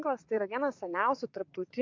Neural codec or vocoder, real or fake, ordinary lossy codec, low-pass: vocoder, 44.1 kHz, 80 mel bands, Vocos; fake; MP3, 48 kbps; 7.2 kHz